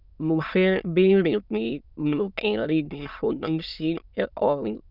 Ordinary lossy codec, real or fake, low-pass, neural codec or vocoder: none; fake; 5.4 kHz; autoencoder, 22.05 kHz, a latent of 192 numbers a frame, VITS, trained on many speakers